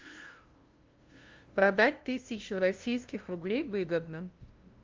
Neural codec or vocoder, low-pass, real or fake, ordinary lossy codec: codec, 16 kHz, 0.5 kbps, FunCodec, trained on LibriTTS, 25 frames a second; 7.2 kHz; fake; Opus, 32 kbps